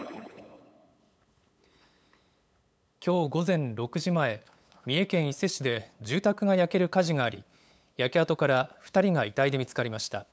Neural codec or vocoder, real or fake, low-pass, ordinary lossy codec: codec, 16 kHz, 8 kbps, FunCodec, trained on LibriTTS, 25 frames a second; fake; none; none